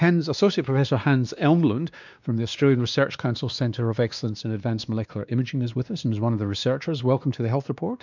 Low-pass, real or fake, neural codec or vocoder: 7.2 kHz; fake; codec, 16 kHz, 2 kbps, X-Codec, WavLM features, trained on Multilingual LibriSpeech